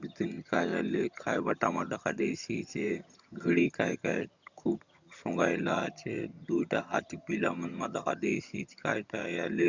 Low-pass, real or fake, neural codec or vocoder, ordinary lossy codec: 7.2 kHz; fake; vocoder, 22.05 kHz, 80 mel bands, HiFi-GAN; Opus, 64 kbps